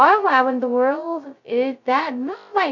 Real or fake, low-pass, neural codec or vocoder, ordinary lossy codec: fake; 7.2 kHz; codec, 16 kHz, 0.2 kbps, FocalCodec; MP3, 64 kbps